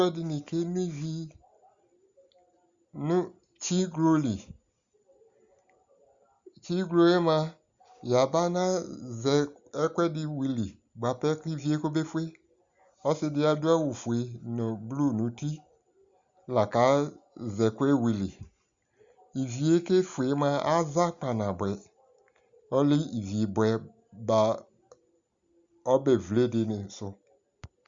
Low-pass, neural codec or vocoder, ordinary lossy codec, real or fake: 7.2 kHz; none; Opus, 64 kbps; real